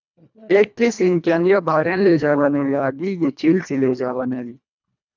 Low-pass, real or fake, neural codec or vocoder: 7.2 kHz; fake; codec, 24 kHz, 1.5 kbps, HILCodec